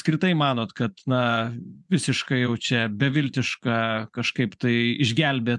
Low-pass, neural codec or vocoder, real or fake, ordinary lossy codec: 10.8 kHz; vocoder, 24 kHz, 100 mel bands, Vocos; fake; MP3, 96 kbps